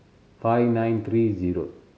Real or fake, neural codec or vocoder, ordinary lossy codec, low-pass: real; none; none; none